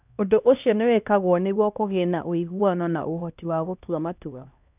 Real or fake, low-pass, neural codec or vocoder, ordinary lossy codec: fake; 3.6 kHz; codec, 16 kHz, 1 kbps, X-Codec, HuBERT features, trained on LibriSpeech; none